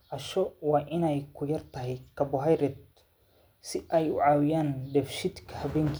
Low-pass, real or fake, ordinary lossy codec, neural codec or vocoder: none; real; none; none